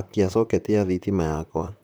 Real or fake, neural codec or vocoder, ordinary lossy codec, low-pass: fake; vocoder, 44.1 kHz, 128 mel bands, Pupu-Vocoder; none; none